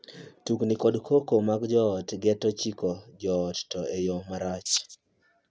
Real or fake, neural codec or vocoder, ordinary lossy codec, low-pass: real; none; none; none